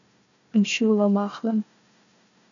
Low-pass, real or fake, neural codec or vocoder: 7.2 kHz; fake; codec, 16 kHz, 1 kbps, FunCodec, trained on Chinese and English, 50 frames a second